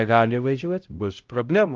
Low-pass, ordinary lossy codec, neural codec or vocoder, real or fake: 7.2 kHz; Opus, 24 kbps; codec, 16 kHz, 0.5 kbps, X-Codec, HuBERT features, trained on LibriSpeech; fake